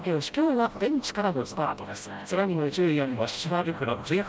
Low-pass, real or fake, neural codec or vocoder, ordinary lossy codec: none; fake; codec, 16 kHz, 0.5 kbps, FreqCodec, smaller model; none